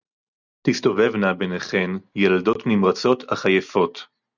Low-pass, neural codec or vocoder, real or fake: 7.2 kHz; none; real